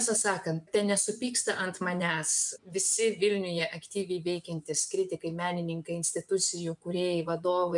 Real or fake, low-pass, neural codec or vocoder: fake; 10.8 kHz; vocoder, 24 kHz, 100 mel bands, Vocos